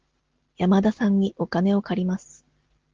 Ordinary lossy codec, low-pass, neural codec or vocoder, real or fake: Opus, 16 kbps; 7.2 kHz; none; real